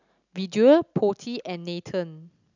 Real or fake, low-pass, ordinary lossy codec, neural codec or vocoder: real; 7.2 kHz; none; none